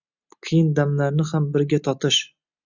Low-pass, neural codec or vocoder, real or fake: 7.2 kHz; none; real